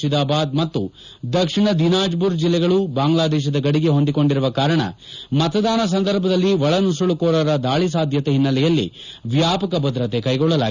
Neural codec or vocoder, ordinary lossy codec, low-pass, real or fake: none; none; 7.2 kHz; real